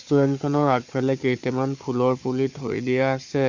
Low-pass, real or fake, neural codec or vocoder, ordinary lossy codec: 7.2 kHz; fake; codec, 16 kHz, 4 kbps, FunCodec, trained on Chinese and English, 50 frames a second; MP3, 48 kbps